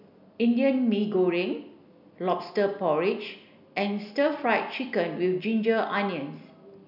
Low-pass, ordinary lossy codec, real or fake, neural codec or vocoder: 5.4 kHz; none; real; none